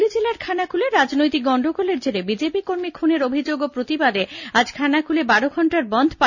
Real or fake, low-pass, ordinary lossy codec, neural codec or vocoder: real; 7.2 kHz; none; none